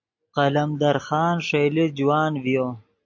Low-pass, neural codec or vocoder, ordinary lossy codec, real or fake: 7.2 kHz; none; AAC, 48 kbps; real